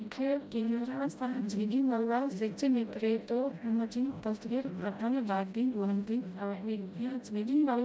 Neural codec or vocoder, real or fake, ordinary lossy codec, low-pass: codec, 16 kHz, 0.5 kbps, FreqCodec, smaller model; fake; none; none